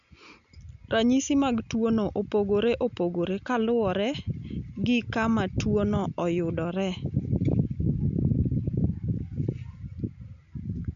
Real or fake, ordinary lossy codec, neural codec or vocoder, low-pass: real; none; none; 7.2 kHz